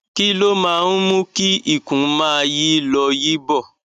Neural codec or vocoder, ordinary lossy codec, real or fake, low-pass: none; none; real; 14.4 kHz